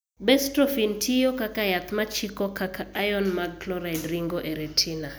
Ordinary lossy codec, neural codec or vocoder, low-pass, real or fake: none; none; none; real